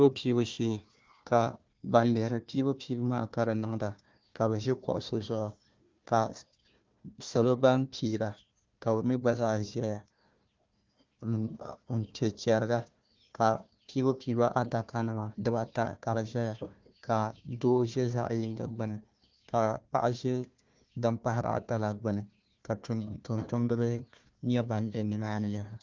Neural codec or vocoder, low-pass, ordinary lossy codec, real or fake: codec, 16 kHz, 1 kbps, FunCodec, trained on Chinese and English, 50 frames a second; 7.2 kHz; Opus, 24 kbps; fake